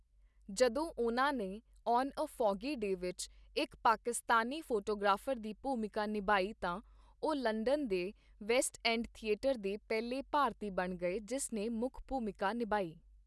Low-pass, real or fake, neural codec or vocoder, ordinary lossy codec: none; real; none; none